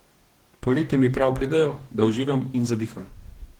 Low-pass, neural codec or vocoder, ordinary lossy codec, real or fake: 19.8 kHz; codec, 44.1 kHz, 2.6 kbps, DAC; Opus, 16 kbps; fake